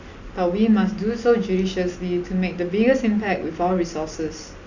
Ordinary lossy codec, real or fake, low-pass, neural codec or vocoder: none; real; 7.2 kHz; none